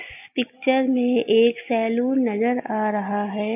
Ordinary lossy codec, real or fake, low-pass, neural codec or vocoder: AAC, 32 kbps; real; 3.6 kHz; none